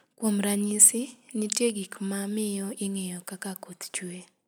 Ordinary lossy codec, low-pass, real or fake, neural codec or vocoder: none; none; real; none